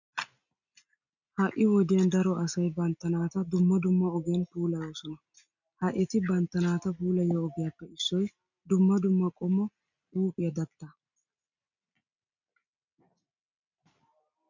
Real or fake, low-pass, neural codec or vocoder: real; 7.2 kHz; none